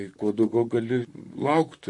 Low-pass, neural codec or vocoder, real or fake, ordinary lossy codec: 10.8 kHz; vocoder, 44.1 kHz, 128 mel bands, Pupu-Vocoder; fake; MP3, 48 kbps